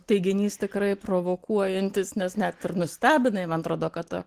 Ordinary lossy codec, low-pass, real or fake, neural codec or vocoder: Opus, 16 kbps; 14.4 kHz; real; none